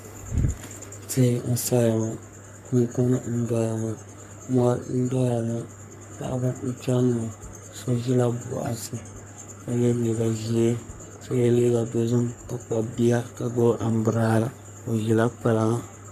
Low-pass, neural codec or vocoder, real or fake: 14.4 kHz; codec, 44.1 kHz, 3.4 kbps, Pupu-Codec; fake